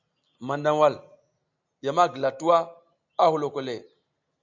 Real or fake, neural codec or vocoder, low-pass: real; none; 7.2 kHz